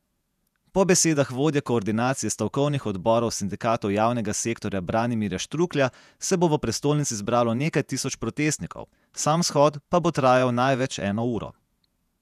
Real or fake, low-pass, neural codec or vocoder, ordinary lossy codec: fake; 14.4 kHz; vocoder, 48 kHz, 128 mel bands, Vocos; none